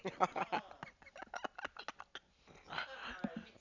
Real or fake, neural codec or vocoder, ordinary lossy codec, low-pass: real; none; none; 7.2 kHz